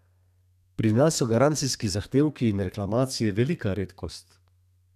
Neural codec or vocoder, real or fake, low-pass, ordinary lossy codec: codec, 32 kHz, 1.9 kbps, SNAC; fake; 14.4 kHz; none